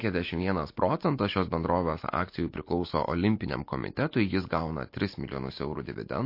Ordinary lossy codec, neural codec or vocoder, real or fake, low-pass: MP3, 32 kbps; none; real; 5.4 kHz